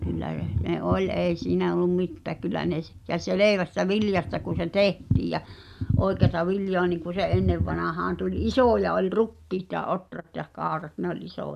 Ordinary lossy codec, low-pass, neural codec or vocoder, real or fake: none; 14.4 kHz; none; real